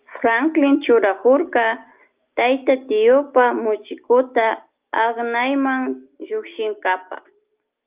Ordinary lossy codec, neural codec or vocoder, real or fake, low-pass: Opus, 32 kbps; none; real; 3.6 kHz